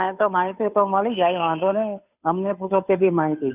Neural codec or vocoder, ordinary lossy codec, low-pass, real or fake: codec, 16 kHz, 2 kbps, FunCodec, trained on Chinese and English, 25 frames a second; none; 3.6 kHz; fake